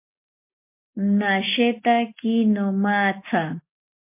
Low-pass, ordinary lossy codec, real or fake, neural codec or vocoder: 3.6 kHz; MP3, 24 kbps; real; none